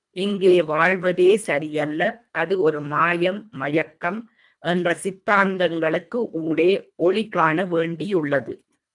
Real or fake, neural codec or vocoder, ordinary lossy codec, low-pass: fake; codec, 24 kHz, 1.5 kbps, HILCodec; MP3, 96 kbps; 10.8 kHz